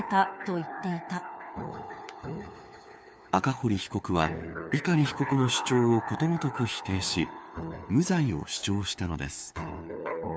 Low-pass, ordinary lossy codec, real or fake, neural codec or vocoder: none; none; fake; codec, 16 kHz, 4 kbps, FunCodec, trained on LibriTTS, 50 frames a second